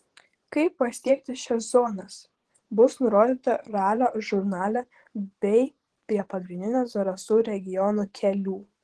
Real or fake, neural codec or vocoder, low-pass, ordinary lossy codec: fake; vocoder, 24 kHz, 100 mel bands, Vocos; 10.8 kHz; Opus, 16 kbps